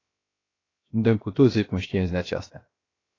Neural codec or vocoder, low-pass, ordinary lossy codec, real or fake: codec, 16 kHz, 0.7 kbps, FocalCodec; 7.2 kHz; AAC, 32 kbps; fake